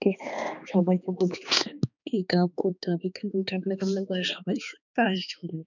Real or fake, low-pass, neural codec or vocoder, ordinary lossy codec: fake; 7.2 kHz; codec, 16 kHz, 2 kbps, X-Codec, HuBERT features, trained on balanced general audio; none